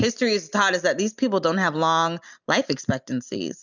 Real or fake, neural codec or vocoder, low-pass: real; none; 7.2 kHz